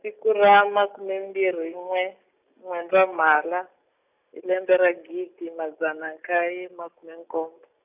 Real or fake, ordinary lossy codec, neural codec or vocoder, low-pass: real; none; none; 3.6 kHz